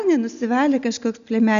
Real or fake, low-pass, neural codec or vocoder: real; 7.2 kHz; none